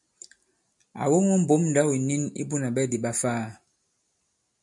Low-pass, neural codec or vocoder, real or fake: 10.8 kHz; none; real